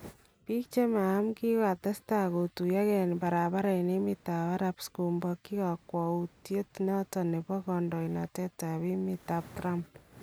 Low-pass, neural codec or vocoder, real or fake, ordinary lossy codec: none; none; real; none